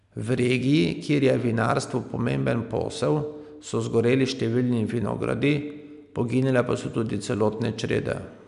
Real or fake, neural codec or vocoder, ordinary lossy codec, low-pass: real; none; none; 10.8 kHz